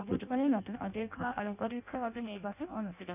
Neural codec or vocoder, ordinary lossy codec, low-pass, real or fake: codec, 16 kHz in and 24 kHz out, 0.6 kbps, FireRedTTS-2 codec; none; 3.6 kHz; fake